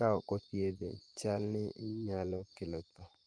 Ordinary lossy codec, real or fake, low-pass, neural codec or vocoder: Opus, 24 kbps; fake; 9.9 kHz; vocoder, 24 kHz, 100 mel bands, Vocos